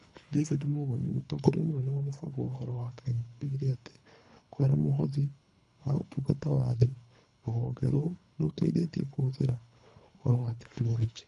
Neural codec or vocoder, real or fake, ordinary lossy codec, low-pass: codec, 24 kHz, 3 kbps, HILCodec; fake; none; 10.8 kHz